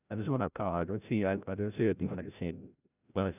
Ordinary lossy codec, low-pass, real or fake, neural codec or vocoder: none; 3.6 kHz; fake; codec, 16 kHz, 0.5 kbps, FreqCodec, larger model